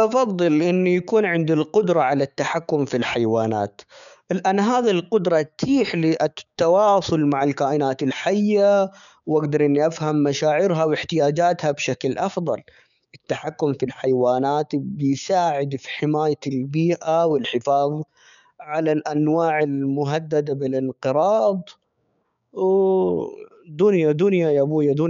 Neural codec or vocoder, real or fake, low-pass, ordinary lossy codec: codec, 16 kHz, 6 kbps, DAC; fake; 7.2 kHz; none